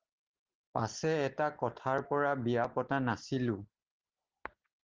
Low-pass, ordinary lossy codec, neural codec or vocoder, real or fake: 7.2 kHz; Opus, 16 kbps; none; real